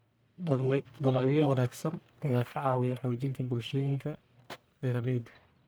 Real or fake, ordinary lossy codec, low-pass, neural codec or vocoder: fake; none; none; codec, 44.1 kHz, 1.7 kbps, Pupu-Codec